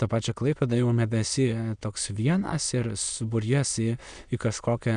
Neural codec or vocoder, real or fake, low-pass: autoencoder, 22.05 kHz, a latent of 192 numbers a frame, VITS, trained on many speakers; fake; 9.9 kHz